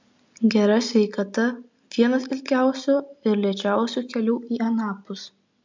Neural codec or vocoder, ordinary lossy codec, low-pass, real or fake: none; MP3, 64 kbps; 7.2 kHz; real